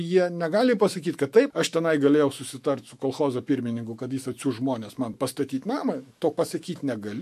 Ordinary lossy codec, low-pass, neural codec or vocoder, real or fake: MP3, 64 kbps; 14.4 kHz; autoencoder, 48 kHz, 128 numbers a frame, DAC-VAE, trained on Japanese speech; fake